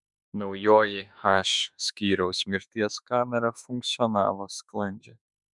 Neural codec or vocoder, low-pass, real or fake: autoencoder, 48 kHz, 32 numbers a frame, DAC-VAE, trained on Japanese speech; 10.8 kHz; fake